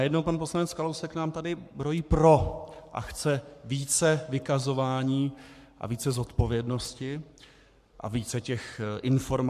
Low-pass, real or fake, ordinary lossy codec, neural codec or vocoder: 14.4 kHz; fake; MP3, 96 kbps; codec, 44.1 kHz, 7.8 kbps, Pupu-Codec